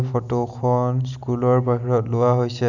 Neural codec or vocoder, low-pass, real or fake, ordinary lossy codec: none; 7.2 kHz; real; none